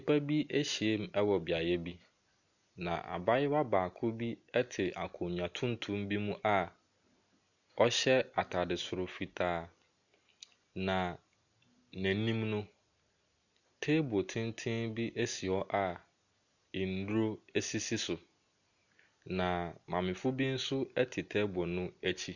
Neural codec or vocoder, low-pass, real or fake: none; 7.2 kHz; real